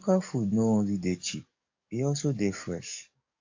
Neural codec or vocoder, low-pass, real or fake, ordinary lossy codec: codec, 44.1 kHz, 7.8 kbps, DAC; 7.2 kHz; fake; AAC, 48 kbps